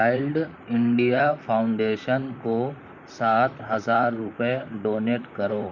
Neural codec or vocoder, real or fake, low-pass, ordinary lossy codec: vocoder, 44.1 kHz, 128 mel bands, Pupu-Vocoder; fake; 7.2 kHz; none